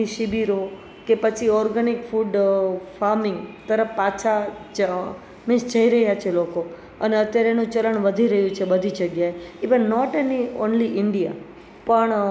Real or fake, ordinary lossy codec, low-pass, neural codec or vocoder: real; none; none; none